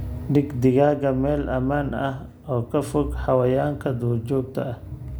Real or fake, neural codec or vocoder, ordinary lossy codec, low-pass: real; none; none; none